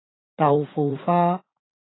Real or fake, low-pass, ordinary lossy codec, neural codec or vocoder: fake; 7.2 kHz; AAC, 16 kbps; vocoder, 44.1 kHz, 80 mel bands, Vocos